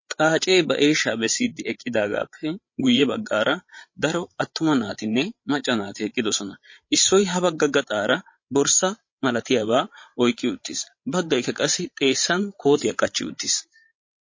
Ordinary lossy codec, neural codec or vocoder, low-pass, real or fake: MP3, 32 kbps; codec, 16 kHz, 16 kbps, FreqCodec, larger model; 7.2 kHz; fake